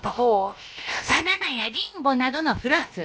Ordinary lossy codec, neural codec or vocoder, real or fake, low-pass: none; codec, 16 kHz, about 1 kbps, DyCAST, with the encoder's durations; fake; none